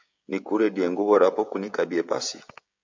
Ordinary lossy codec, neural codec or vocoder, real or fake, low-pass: MP3, 64 kbps; codec, 16 kHz, 16 kbps, FreqCodec, smaller model; fake; 7.2 kHz